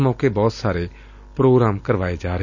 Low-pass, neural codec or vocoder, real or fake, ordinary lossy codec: 7.2 kHz; none; real; none